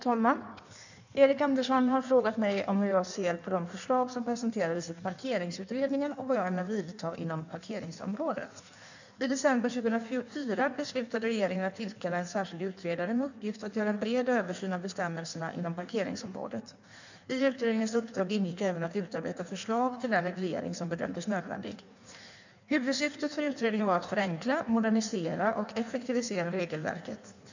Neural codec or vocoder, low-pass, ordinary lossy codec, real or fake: codec, 16 kHz in and 24 kHz out, 1.1 kbps, FireRedTTS-2 codec; 7.2 kHz; none; fake